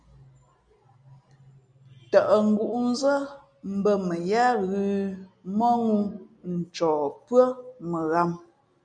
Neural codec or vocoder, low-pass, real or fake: none; 9.9 kHz; real